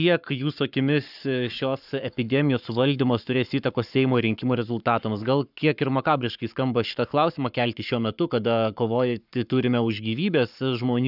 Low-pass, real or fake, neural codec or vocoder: 5.4 kHz; fake; codec, 44.1 kHz, 7.8 kbps, Pupu-Codec